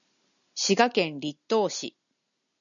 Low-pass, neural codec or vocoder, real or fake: 7.2 kHz; none; real